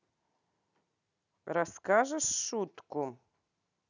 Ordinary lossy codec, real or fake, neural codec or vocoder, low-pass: none; real; none; 7.2 kHz